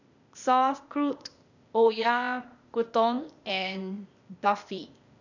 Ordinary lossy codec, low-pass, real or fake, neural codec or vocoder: none; 7.2 kHz; fake; codec, 16 kHz, 0.8 kbps, ZipCodec